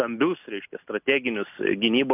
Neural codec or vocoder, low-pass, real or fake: none; 3.6 kHz; real